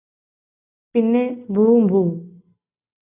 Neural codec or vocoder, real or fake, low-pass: none; real; 3.6 kHz